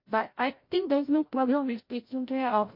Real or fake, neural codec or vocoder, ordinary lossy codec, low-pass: fake; codec, 16 kHz, 0.5 kbps, FreqCodec, larger model; MP3, 32 kbps; 5.4 kHz